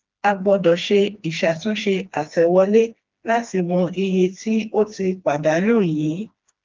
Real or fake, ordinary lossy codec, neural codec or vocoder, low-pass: fake; Opus, 32 kbps; codec, 16 kHz, 2 kbps, FreqCodec, smaller model; 7.2 kHz